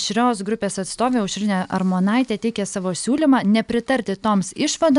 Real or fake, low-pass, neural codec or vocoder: real; 10.8 kHz; none